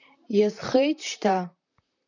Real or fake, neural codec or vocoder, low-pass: fake; vocoder, 44.1 kHz, 128 mel bands, Pupu-Vocoder; 7.2 kHz